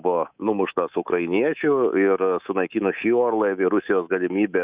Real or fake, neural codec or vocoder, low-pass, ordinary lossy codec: fake; codec, 24 kHz, 3.1 kbps, DualCodec; 3.6 kHz; Opus, 64 kbps